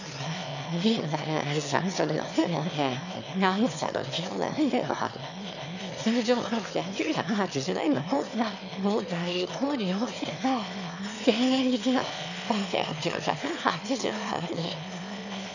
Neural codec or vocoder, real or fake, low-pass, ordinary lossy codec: autoencoder, 22.05 kHz, a latent of 192 numbers a frame, VITS, trained on one speaker; fake; 7.2 kHz; none